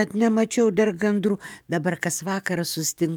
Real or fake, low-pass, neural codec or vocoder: fake; 19.8 kHz; codec, 44.1 kHz, 7.8 kbps, DAC